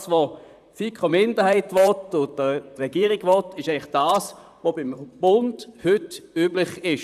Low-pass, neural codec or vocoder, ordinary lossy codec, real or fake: 14.4 kHz; vocoder, 44.1 kHz, 128 mel bands, Pupu-Vocoder; none; fake